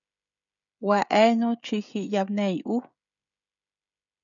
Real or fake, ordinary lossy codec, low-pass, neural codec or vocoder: fake; MP3, 96 kbps; 7.2 kHz; codec, 16 kHz, 16 kbps, FreqCodec, smaller model